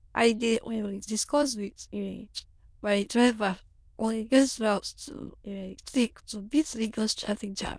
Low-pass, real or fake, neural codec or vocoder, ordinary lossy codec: none; fake; autoencoder, 22.05 kHz, a latent of 192 numbers a frame, VITS, trained on many speakers; none